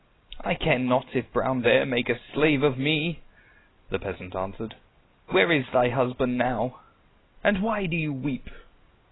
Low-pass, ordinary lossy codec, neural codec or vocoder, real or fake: 7.2 kHz; AAC, 16 kbps; none; real